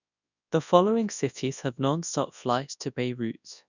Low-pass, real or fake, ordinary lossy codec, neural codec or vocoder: 7.2 kHz; fake; none; codec, 24 kHz, 0.9 kbps, WavTokenizer, large speech release